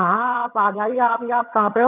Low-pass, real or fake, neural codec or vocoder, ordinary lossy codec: 3.6 kHz; fake; vocoder, 22.05 kHz, 80 mel bands, HiFi-GAN; none